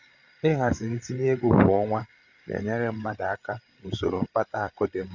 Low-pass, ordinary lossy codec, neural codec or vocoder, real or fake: 7.2 kHz; none; codec, 16 kHz, 8 kbps, FreqCodec, larger model; fake